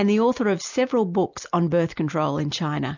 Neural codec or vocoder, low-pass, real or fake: none; 7.2 kHz; real